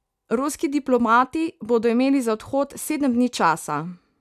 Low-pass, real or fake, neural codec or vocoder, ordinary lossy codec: 14.4 kHz; real; none; none